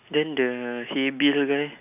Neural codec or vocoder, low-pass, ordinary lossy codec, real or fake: none; 3.6 kHz; none; real